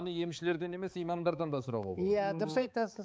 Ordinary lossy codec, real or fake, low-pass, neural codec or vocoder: none; fake; none; codec, 16 kHz, 4 kbps, X-Codec, HuBERT features, trained on balanced general audio